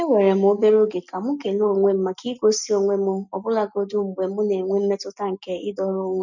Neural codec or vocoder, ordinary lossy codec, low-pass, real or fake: none; none; 7.2 kHz; real